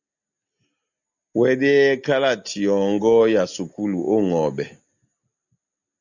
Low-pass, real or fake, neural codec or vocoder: 7.2 kHz; real; none